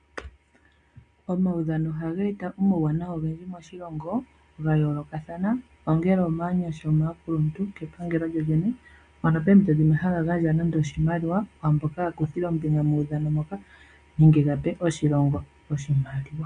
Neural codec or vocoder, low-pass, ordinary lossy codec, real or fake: none; 9.9 kHz; MP3, 64 kbps; real